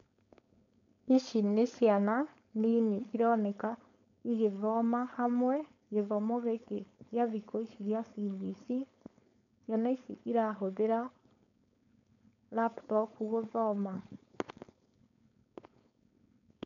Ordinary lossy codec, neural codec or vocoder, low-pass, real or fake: none; codec, 16 kHz, 4.8 kbps, FACodec; 7.2 kHz; fake